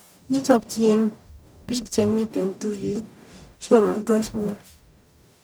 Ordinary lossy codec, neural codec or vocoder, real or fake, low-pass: none; codec, 44.1 kHz, 0.9 kbps, DAC; fake; none